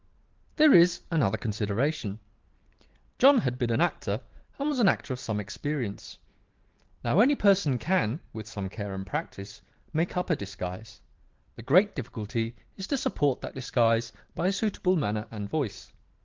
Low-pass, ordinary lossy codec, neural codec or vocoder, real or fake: 7.2 kHz; Opus, 16 kbps; vocoder, 44.1 kHz, 128 mel bands every 512 samples, BigVGAN v2; fake